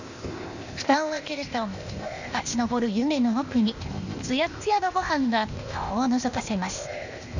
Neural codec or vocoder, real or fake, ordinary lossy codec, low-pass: codec, 16 kHz, 0.8 kbps, ZipCodec; fake; none; 7.2 kHz